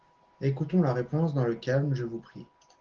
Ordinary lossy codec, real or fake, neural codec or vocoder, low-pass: Opus, 16 kbps; real; none; 7.2 kHz